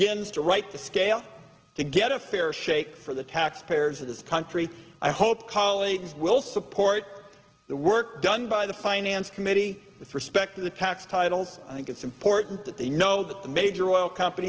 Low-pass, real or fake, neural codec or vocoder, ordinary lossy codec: 7.2 kHz; real; none; Opus, 16 kbps